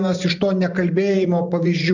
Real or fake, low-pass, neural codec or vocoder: fake; 7.2 kHz; vocoder, 44.1 kHz, 128 mel bands every 512 samples, BigVGAN v2